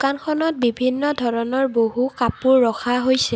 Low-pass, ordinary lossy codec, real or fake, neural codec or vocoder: none; none; real; none